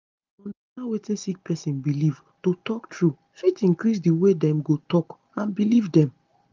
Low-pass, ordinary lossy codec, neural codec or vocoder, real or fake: 7.2 kHz; Opus, 24 kbps; none; real